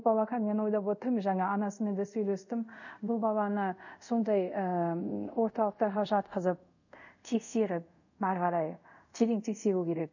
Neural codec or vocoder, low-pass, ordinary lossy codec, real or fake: codec, 24 kHz, 0.5 kbps, DualCodec; 7.2 kHz; none; fake